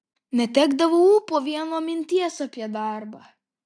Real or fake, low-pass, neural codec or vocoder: real; 9.9 kHz; none